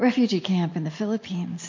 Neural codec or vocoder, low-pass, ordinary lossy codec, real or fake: none; 7.2 kHz; MP3, 48 kbps; real